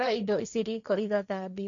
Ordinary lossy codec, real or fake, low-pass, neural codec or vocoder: none; fake; 7.2 kHz; codec, 16 kHz, 1.1 kbps, Voila-Tokenizer